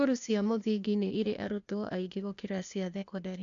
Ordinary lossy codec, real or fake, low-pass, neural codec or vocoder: none; fake; 7.2 kHz; codec, 16 kHz, 0.8 kbps, ZipCodec